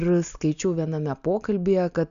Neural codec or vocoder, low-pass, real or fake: none; 7.2 kHz; real